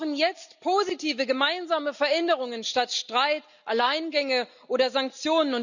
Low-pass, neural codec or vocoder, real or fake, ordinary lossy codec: 7.2 kHz; none; real; none